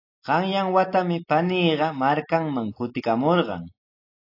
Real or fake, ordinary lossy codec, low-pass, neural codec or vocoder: real; AAC, 32 kbps; 5.4 kHz; none